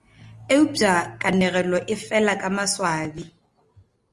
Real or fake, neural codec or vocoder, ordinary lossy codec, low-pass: real; none; Opus, 32 kbps; 10.8 kHz